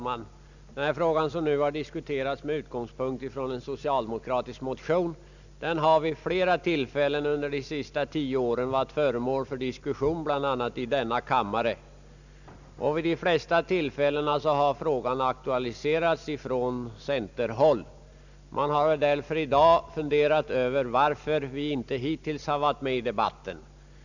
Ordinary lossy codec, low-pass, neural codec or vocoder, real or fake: none; 7.2 kHz; none; real